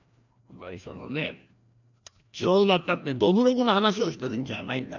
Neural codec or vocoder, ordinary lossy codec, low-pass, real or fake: codec, 16 kHz, 1 kbps, FreqCodec, larger model; none; 7.2 kHz; fake